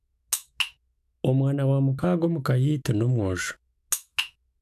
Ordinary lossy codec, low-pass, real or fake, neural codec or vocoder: AAC, 96 kbps; 14.4 kHz; fake; autoencoder, 48 kHz, 128 numbers a frame, DAC-VAE, trained on Japanese speech